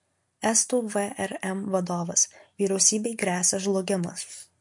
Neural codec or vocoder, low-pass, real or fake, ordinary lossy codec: none; 10.8 kHz; real; MP3, 48 kbps